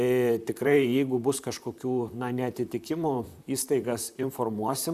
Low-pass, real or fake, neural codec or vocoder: 14.4 kHz; fake; vocoder, 44.1 kHz, 128 mel bands, Pupu-Vocoder